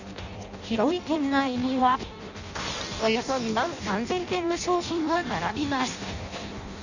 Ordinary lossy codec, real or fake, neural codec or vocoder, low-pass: none; fake; codec, 16 kHz in and 24 kHz out, 0.6 kbps, FireRedTTS-2 codec; 7.2 kHz